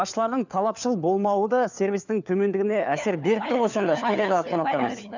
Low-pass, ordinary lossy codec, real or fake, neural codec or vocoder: 7.2 kHz; none; fake; codec, 16 kHz, 4 kbps, FunCodec, trained on LibriTTS, 50 frames a second